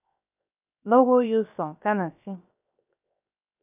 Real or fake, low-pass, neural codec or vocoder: fake; 3.6 kHz; codec, 16 kHz, 0.7 kbps, FocalCodec